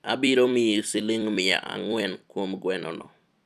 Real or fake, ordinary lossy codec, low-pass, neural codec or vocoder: fake; none; 14.4 kHz; vocoder, 44.1 kHz, 128 mel bands every 256 samples, BigVGAN v2